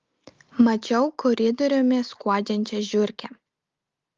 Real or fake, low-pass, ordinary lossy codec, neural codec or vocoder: real; 7.2 kHz; Opus, 32 kbps; none